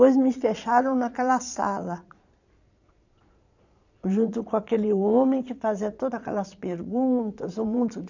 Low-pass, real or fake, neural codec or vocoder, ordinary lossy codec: 7.2 kHz; fake; vocoder, 22.05 kHz, 80 mel bands, Vocos; none